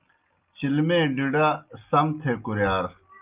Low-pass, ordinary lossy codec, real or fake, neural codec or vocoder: 3.6 kHz; Opus, 24 kbps; real; none